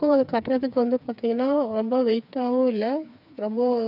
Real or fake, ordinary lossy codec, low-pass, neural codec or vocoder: fake; none; 5.4 kHz; codec, 16 kHz, 4 kbps, FreqCodec, smaller model